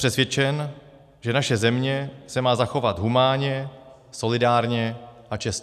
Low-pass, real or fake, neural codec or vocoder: 14.4 kHz; real; none